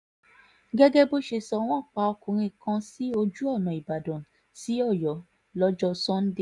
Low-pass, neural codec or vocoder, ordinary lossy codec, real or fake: 10.8 kHz; none; none; real